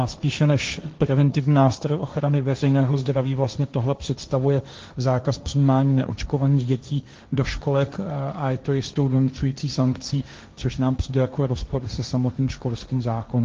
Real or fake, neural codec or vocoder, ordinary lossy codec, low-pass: fake; codec, 16 kHz, 1.1 kbps, Voila-Tokenizer; Opus, 32 kbps; 7.2 kHz